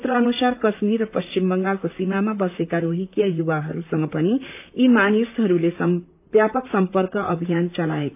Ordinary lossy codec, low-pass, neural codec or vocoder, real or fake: AAC, 32 kbps; 3.6 kHz; vocoder, 44.1 kHz, 128 mel bands, Pupu-Vocoder; fake